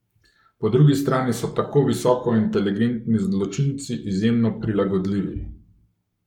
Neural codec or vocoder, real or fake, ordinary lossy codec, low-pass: codec, 44.1 kHz, 7.8 kbps, Pupu-Codec; fake; none; 19.8 kHz